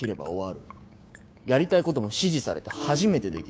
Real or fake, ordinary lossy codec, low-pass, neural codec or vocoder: fake; none; none; codec, 16 kHz, 6 kbps, DAC